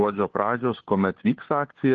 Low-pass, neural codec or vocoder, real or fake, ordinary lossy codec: 7.2 kHz; codec, 16 kHz, 4 kbps, FunCodec, trained on LibriTTS, 50 frames a second; fake; Opus, 16 kbps